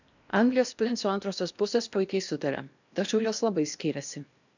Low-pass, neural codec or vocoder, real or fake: 7.2 kHz; codec, 16 kHz in and 24 kHz out, 0.8 kbps, FocalCodec, streaming, 65536 codes; fake